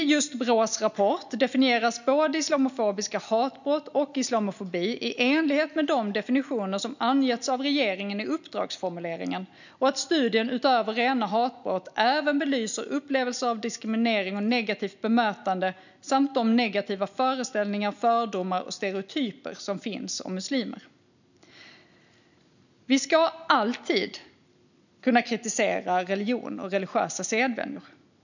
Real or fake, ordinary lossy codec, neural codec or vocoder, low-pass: real; none; none; 7.2 kHz